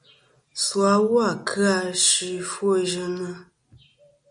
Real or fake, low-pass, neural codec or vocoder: real; 9.9 kHz; none